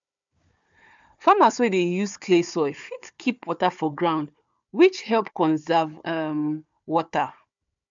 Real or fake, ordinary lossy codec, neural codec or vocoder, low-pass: fake; MP3, 64 kbps; codec, 16 kHz, 4 kbps, FunCodec, trained on Chinese and English, 50 frames a second; 7.2 kHz